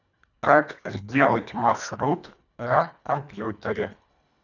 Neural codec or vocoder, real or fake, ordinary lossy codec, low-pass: codec, 24 kHz, 1.5 kbps, HILCodec; fake; none; 7.2 kHz